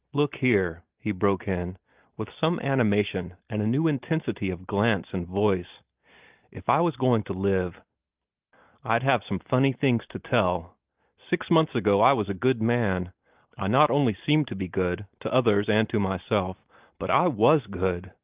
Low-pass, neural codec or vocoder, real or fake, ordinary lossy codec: 3.6 kHz; none; real; Opus, 32 kbps